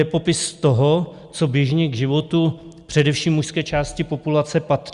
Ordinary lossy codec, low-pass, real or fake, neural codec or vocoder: Opus, 64 kbps; 10.8 kHz; real; none